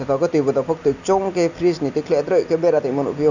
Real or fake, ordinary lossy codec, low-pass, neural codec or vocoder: real; none; 7.2 kHz; none